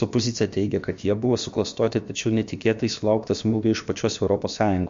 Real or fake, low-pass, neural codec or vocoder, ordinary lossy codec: fake; 7.2 kHz; codec, 16 kHz, about 1 kbps, DyCAST, with the encoder's durations; MP3, 48 kbps